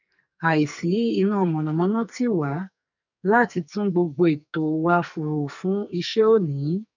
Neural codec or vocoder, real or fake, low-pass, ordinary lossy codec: codec, 44.1 kHz, 2.6 kbps, SNAC; fake; 7.2 kHz; none